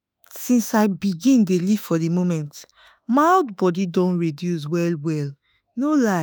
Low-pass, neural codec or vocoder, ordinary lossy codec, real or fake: none; autoencoder, 48 kHz, 32 numbers a frame, DAC-VAE, trained on Japanese speech; none; fake